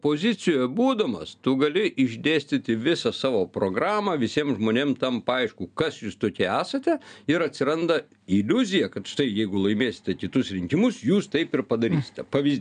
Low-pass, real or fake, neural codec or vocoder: 9.9 kHz; real; none